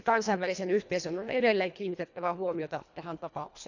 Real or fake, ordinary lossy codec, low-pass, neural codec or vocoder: fake; none; 7.2 kHz; codec, 24 kHz, 1.5 kbps, HILCodec